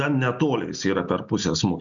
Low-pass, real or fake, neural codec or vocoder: 7.2 kHz; real; none